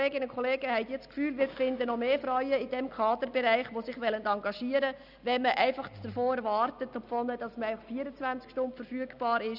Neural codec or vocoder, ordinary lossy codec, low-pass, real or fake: none; none; 5.4 kHz; real